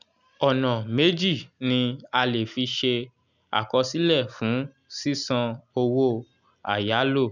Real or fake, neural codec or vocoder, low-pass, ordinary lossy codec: real; none; 7.2 kHz; none